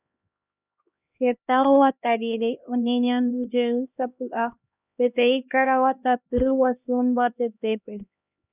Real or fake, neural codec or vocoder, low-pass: fake; codec, 16 kHz, 1 kbps, X-Codec, HuBERT features, trained on LibriSpeech; 3.6 kHz